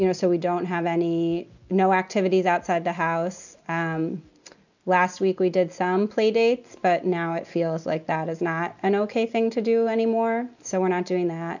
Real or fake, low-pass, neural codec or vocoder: real; 7.2 kHz; none